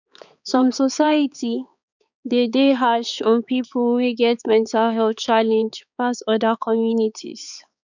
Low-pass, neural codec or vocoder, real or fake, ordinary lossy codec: 7.2 kHz; codec, 16 kHz, 4 kbps, X-Codec, HuBERT features, trained on balanced general audio; fake; none